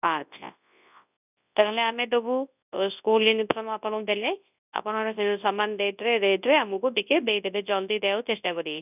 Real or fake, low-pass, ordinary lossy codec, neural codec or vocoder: fake; 3.6 kHz; none; codec, 24 kHz, 0.9 kbps, WavTokenizer, large speech release